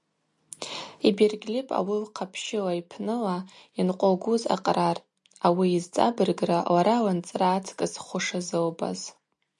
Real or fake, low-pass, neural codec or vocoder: real; 10.8 kHz; none